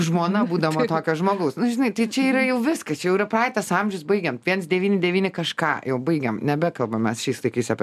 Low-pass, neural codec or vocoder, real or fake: 14.4 kHz; none; real